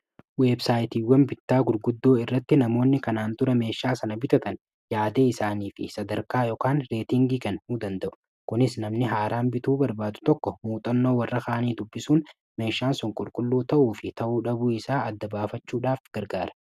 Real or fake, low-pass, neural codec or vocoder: real; 14.4 kHz; none